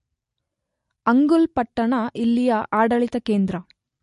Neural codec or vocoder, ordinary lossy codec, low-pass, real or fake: none; MP3, 48 kbps; 10.8 kHz; real